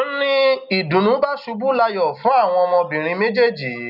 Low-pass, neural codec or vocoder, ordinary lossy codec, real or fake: 5.4 kHz; none; none; real